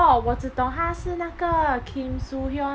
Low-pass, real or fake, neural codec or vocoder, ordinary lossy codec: none; real; none; none